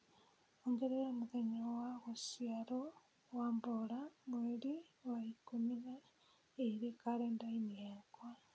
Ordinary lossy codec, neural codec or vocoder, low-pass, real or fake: none; none; none; real